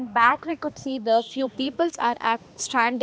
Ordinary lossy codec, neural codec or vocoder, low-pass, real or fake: none; codec, 16 kHz, 2 kbps, X-Codec, HuBERT features, trained on balanced general audio; none; fake